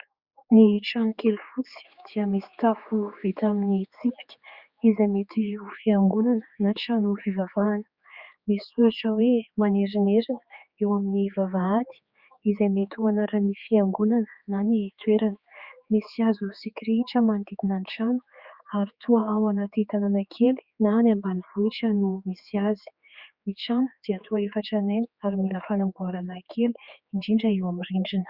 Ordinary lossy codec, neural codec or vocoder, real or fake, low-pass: Opus, 64 kbps; codec, 16 kHz, 4 kbps, X-Codec, HuBERT features, trained on general audio; fake; 5.4 kHz